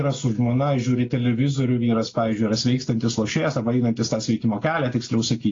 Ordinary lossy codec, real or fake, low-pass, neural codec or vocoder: AAC, 32 kbps; real; 7.2 kHz; none